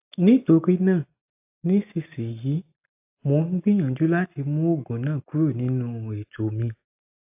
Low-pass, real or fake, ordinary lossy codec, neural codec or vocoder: 3.6 kHz; real; AAC, 32 kbps; none